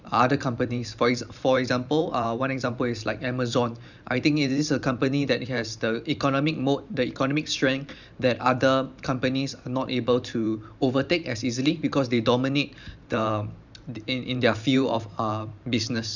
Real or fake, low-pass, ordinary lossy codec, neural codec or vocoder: fake; 7.2 kHz; none; vocoder, 44.1 kHz, 128 mel bands every 512 samples, BigVGAN v2